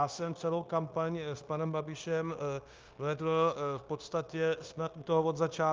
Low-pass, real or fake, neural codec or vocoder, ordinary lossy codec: 7.2 kHz; fake; codec, 16 kHz, 0.9 kbps, LongCat-Audio-Codec; Opus, 24 kbps